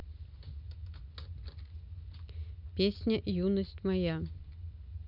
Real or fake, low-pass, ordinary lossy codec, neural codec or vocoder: real; 5.4 kHz; none; none